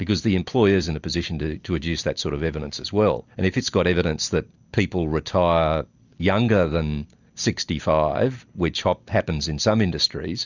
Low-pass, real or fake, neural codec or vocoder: 7.2 kHz; real; none